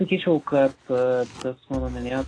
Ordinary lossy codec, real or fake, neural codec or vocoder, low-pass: AAC, 32 kbps; real; none; 9.9 kHz